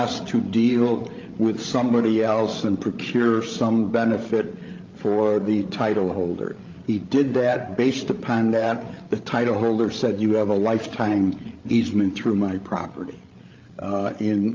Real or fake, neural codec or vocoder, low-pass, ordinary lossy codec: fake; codec, 16 kHz, 16 kbps, FreqCodec, larger model; 7.2 kHz; Opus, 24 kbps